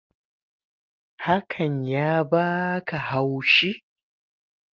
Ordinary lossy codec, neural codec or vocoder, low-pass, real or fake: Opus, 24 kbps; none; 7.2 kHz; real